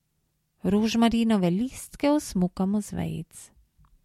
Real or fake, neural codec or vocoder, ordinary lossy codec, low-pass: real; none; MP3, 64 kbps; 19.8 kHz